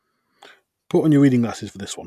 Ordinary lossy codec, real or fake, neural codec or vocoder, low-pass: none; real; none; 14.4 kHz